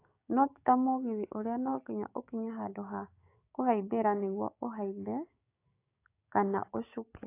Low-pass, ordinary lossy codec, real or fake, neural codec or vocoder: 3.6 kHz; AAC, 24 kbps; fake; codec, 16 kHz, 6 kbps, DAC